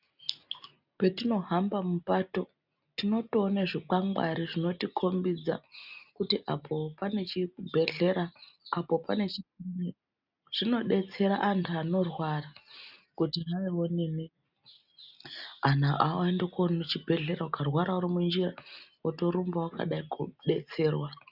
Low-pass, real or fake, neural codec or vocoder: 5.4 kHz; real; none